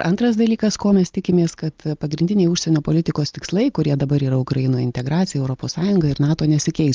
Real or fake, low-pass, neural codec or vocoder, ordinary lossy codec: real; 7.2 kHz; none; Opus, 32 kbps